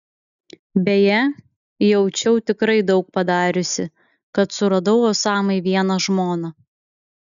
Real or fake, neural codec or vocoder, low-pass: real; none; 7.2 kHz